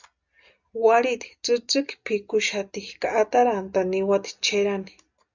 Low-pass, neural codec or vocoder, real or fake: 7.2 kHz; none; real